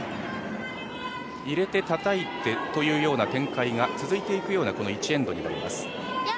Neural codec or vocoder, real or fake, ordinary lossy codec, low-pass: none; real; none; none